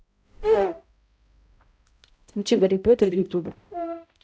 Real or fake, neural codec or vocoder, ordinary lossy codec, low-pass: fake; codec, 16 kHz, 0.5 kbps, X-Codec, HuBERT features, trained on balanced general audio; none; none